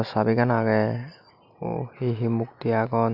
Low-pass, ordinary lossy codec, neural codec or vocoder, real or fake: 5.4 kHz; none; none; real